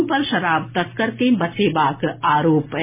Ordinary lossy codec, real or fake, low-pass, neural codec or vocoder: MP3, 24 kbps; real; 3.6 kHz; none